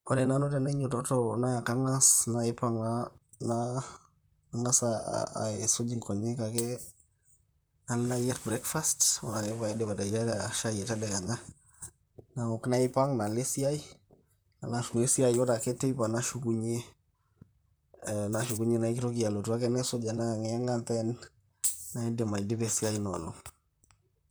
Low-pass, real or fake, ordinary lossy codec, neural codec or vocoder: none; fake; none; vocoder, 44.1 kHz, 128 mel bands, Pupu-Vocoder